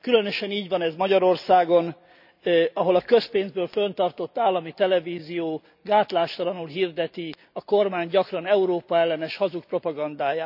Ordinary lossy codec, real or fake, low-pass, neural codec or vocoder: none; real; 5.4 kHz; none